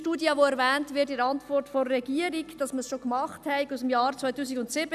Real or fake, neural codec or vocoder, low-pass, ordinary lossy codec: real; none; 14.4 kHz; none